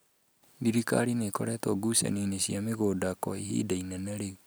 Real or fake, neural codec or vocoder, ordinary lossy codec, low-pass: real; none; none; none